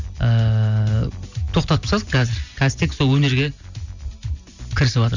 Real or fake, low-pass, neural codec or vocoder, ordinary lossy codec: real; 7.2 kHz; none; none